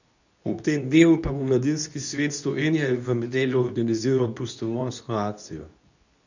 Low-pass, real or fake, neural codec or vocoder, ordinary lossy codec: 7.2 kHz; fake; codec, 24 kHz, 0.9 kbps, WavTokenizer, medium speech release version 2; none